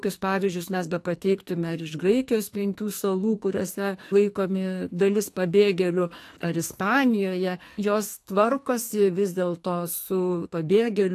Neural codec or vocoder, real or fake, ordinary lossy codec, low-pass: codec, 44.1 kHz, 2.6 kbps, SNAC; fake; AAC, 64 kbps; 14.4 kHz